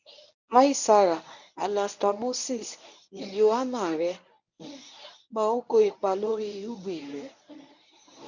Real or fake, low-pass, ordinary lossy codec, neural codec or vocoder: fake; 7.2 kHz; none; codec, 24 kHz, 0.9 kbps, WavTokenizer, medium speech release version 1